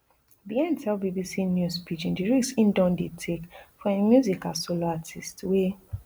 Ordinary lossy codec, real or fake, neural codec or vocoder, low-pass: none; real; none; none